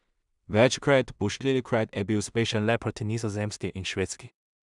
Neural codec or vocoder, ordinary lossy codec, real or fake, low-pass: codec, 16 kHz in and 24 kHz out, 0.4 kbps, LongCat-Audio-Codec, two codebook decoder; none; fake; 10.8 kHz